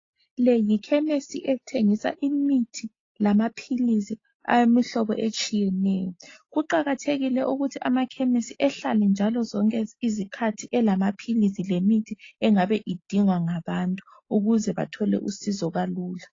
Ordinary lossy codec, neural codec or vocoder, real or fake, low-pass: AAC, 32 kbps; none; real; 7.2 kHz